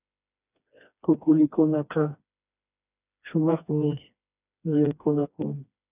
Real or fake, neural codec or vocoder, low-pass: fake; codec, 16 kHz, 2 kbps, FreqCodec, smaller model; 3.6 kHz